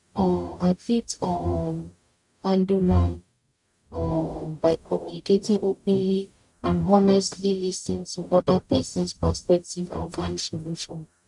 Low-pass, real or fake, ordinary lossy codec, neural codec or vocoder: 10.8 kHz; fake; none; codec, 44.1 kHz, 0.9 kbps, DAC